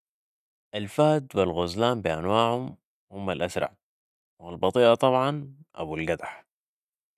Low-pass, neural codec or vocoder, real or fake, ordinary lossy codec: 14.4 kHz; none; real; none